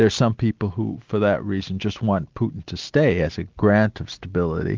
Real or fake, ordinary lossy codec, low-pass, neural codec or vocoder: real; Opus, 32 kbps; 7.2 kHz; none